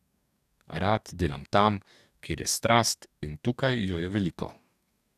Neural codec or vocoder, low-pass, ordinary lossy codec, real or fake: codec, 44.1 kHz, 2.6 kbps, DAC; 14.4 kHz; none; fake